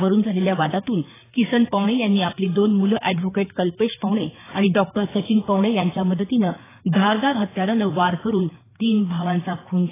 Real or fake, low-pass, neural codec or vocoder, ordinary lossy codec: fake; 3.6 kHz; codec, 16 kHz, 4 kbps, FreqCodec, larger model; AAC, 16 kbps